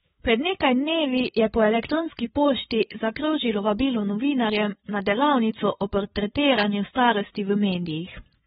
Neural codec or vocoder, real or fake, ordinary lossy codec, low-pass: codec, 16 kHz, 8 kbps, FreqCodec, larger model; fake; AAC, 16 kbps; 7.2 kHz